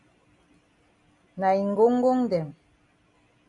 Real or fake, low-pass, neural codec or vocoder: real; 10.8 kHz; none